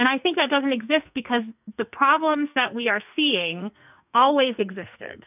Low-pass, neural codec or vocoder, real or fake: 3.6 kHz; codec, 44.1 kHz, 2.6 kbps, SNAC; fake